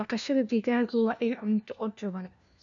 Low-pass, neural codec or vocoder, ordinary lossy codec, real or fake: 7.2 kHz; codec, 16 kHz, 1 kbps, FunCodec, trained on LibriTTS, 50 frames a second; none; fake